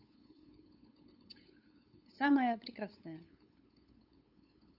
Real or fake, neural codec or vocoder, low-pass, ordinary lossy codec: fake; codec, 16 kHz, 8 kbps, FunCodec, trained on LibriTTS, 25 frames a second; 5.4 kHz; none